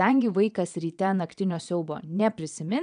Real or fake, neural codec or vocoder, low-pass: fake; vocoder, 22.05 kHz, 80 mel bands, Vocos; 9.9 kHz